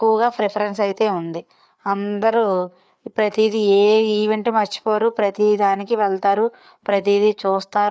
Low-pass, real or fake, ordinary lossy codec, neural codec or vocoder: none; fake; none; codec, 16 kHz, 4 kbps, FreqCodec, larger model